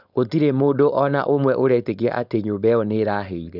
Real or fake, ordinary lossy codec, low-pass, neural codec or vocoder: fake; none; 5.4 kHz; codec, 16 kHz, 4.8 kbps, FACodec